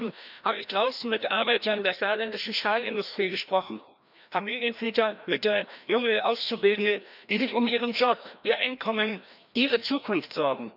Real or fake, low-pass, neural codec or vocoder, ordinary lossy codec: fake; 5.4 kHz; codec, 16 kHz, 1 kbps, FreqCodec, larger model; none